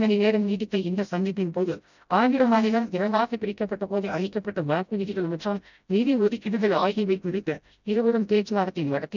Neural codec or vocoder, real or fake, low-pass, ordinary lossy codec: codec, 16 kHz, 0.5 kbps, FreqCodec, smaller model; fake; 7.2 kHz; none